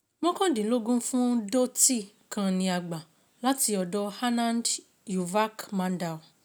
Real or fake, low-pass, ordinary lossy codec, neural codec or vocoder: real; none; none; none